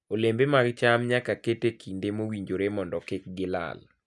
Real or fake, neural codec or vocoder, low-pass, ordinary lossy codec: real; none; none; none